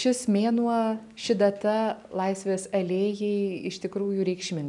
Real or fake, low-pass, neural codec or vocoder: real; 10.8 kHz; none